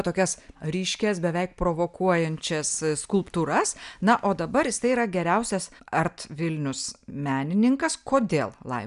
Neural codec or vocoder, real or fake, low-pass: none; real; 10.8 kHz